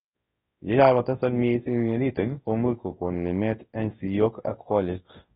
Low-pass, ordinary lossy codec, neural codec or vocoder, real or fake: 10.8 kHz; AAC, 16 kbps; codec, 24 kHz, 0.9 kbps, WavTokenizer, large speech release; fake